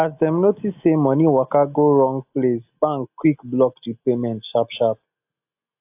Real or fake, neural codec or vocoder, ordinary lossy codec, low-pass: real; none; AAC, 32 kbps; 3.6 kHz